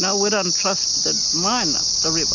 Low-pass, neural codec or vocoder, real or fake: 7.2 kHz; none; real